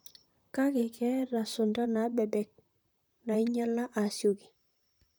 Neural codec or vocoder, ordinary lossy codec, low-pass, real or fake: vocoder, 44.1 kHz, 128 mel bands, Pupu-Vocoder; none; none; fake